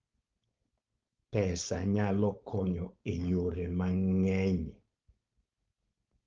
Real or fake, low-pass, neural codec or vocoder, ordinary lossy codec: fake; 7.2 kHz; codec, 16 kHz, 4.8 kbps, FACodec; Opus, 24 kbps